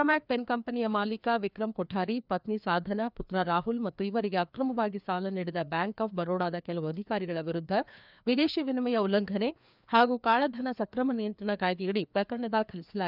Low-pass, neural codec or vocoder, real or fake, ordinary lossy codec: 5.4 kHz; codec, 16 kHz, 2 kbps, FreqCodec, larger model; fake; none